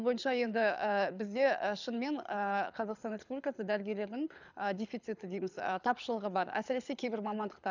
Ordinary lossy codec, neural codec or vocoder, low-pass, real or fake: none; codec, 24 kHz, 6 kbps, HILCodec; 7.2 kHz; fake